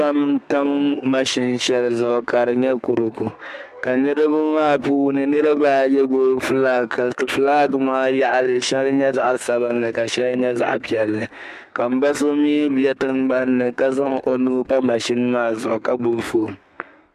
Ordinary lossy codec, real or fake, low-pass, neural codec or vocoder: MP3, 96 kbps; fake; 14.4 kHz; codec, 32 kHz, 1.9 kbps, SNAC